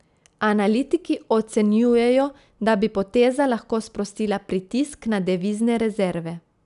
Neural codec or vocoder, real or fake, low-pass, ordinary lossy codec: none; real; 10.8 kHz; none